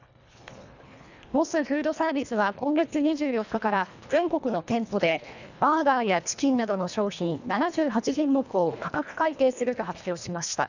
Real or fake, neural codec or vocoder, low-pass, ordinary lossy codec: fake; codec, 24 kHz, 1.5 kbps, HILCodec; 7.2 kHz; none